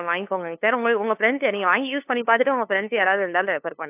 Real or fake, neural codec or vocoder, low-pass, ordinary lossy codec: fake; codec, 16 kHz, 2 kbps, FunCodec, trained on LibriTTS, 25 frames a second; 3.6 kHz; AAC, 32 kbps